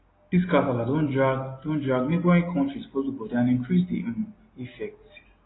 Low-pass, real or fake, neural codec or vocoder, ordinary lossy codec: 7.2 kHz; real; none; AAC, 16 kbps